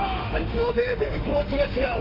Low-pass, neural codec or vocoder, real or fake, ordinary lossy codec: 5.4 kHz; autoencoder, 48 kHz, 32 numbers a frame, DAC-VAE, trained on Japanese speech; fake; none